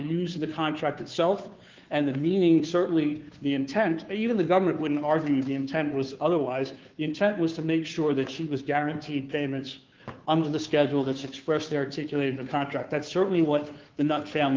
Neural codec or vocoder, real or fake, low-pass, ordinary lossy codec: codec, 16 kHz, 2 kbps, FunCodec, trained on Chinese and English, 25 frames a second; fake; 7.2 kHz; Opus, 32 kbps